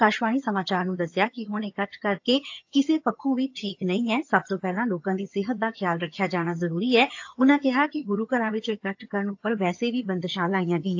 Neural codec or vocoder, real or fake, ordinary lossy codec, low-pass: vocoder, 22.05 kHz, 80 mel bands, HiFi-GAN; fake; AAC, 48 kbps; 7.2 kHz